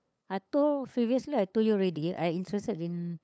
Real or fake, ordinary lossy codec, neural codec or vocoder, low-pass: fake; none; codec, 16 kHz, 8 kbps, FunCodec, trained on LibriTTS, 25 frames a second; none